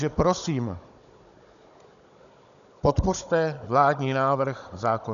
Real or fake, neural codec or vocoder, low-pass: fake; codec, 16 kHz, 16 kbps, FunCodec, trained on Chinese and English, 50 frames a second; 7.2 kHz